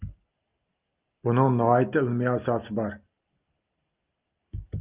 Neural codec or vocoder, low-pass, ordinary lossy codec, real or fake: none; 3.6 kHz; Opus, 32 kbps; real